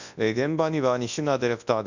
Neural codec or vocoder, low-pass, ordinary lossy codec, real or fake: codec, 24 kHz, 0.9 kbps, WavTokenizer, large speech release; 7.2 kHz; none; fake